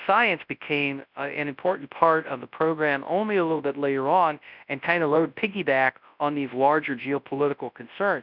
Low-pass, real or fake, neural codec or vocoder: 5.4 kHz; fake; codec, 24 kHz, 0.9 kbps, WavTokenizer, large speech release